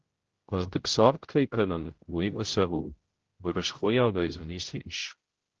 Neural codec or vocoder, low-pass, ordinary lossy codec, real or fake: codec, 16 kHz, 0.5 kbps, X-Codec, HuBERT features, trained on general audio; 7.2 kHz; Opus, 16 kbps; fake